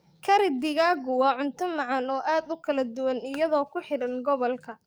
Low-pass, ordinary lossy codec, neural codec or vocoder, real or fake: none; none; codec, 44.1 kHz, 7.8 kbps, DAC; fake